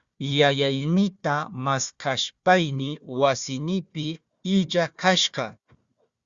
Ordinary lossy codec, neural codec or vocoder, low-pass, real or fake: Opus, 64 kbps; codec, 16 kHz, 1 kbps, FunCodec, trained on Chinese and English, 50 frames a second; 7.2 kHz; fake